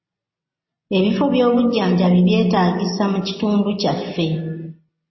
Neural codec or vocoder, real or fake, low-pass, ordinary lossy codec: none; real; 7.2 kHz; MP3, 24 kbps